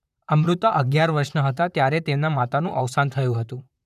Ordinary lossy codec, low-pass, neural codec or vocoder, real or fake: none; 14.4 kHz; vocoder, 44.1 kHz, 128 mel bands, Pupu-Vocoder; fake